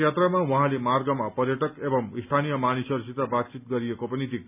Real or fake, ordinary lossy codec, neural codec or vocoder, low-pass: real; none; none; 3.6 kHz